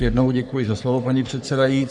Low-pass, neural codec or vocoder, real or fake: 10.8 kHz; codec, 44.1 kHz, 3.4 kbps, Pupu-Codec; fake